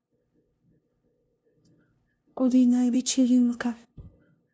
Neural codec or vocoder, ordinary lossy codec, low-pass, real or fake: codec, 16 kHz, 0.5 kbps, FunCodec, trained on LibriTTS, 25 frames a second; none; none; fake